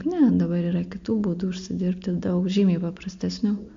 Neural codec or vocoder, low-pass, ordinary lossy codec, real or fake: none; 7.2 kHz; MP3, 48 kbps; real